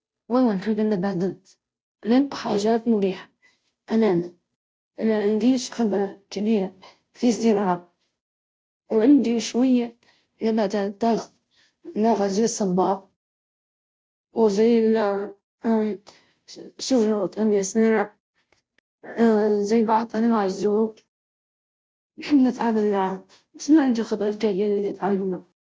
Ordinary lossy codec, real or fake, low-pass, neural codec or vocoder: none; fake; none; codec, 16 kHz, 0.5 kbps, FunCodec, trained on Chinese and English, 25 frames a second